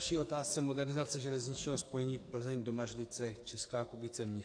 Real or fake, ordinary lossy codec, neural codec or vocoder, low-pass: fake; AAC, 48 kbps; codec, 44.1 kHz, 2.6 kbps, SNAC; 9.9 kHz